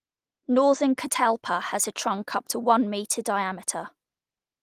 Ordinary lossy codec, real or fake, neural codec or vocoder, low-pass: Opus, 24 kbps; real; none; 14.4 kHz